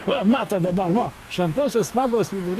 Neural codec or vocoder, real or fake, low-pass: codec, 44.1 kHz, 2.6 kbps, DAC; fake; 14.4 kHz